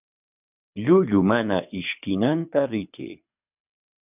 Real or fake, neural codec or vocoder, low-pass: fake; codec, 24 kHz, 6 kbps, HILCodec; 3.6 kHz